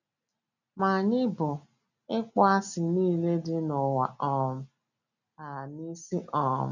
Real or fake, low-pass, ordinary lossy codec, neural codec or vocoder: real; 7.2 kHz; none; none